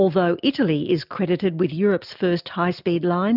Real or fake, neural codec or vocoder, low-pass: real; none; 5.4 kHz